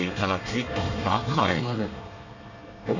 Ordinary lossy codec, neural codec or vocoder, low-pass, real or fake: none; codec, 24 kHz, 1 kbps, SNAC; 7.2 kHz; fake